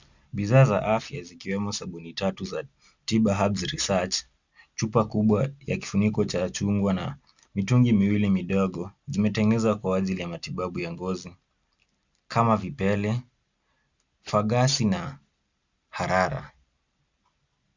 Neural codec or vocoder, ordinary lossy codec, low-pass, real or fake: none; Opus, 64 kbps; 7.2 kHz; real